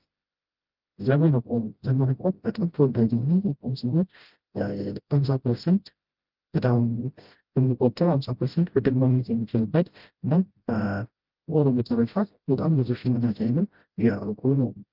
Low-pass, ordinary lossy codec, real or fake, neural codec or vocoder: 5.4 kHz; Opus, 32 kbps; fake; codec, 16 kHz, 0.5 kbps, FreqCodec, smaller model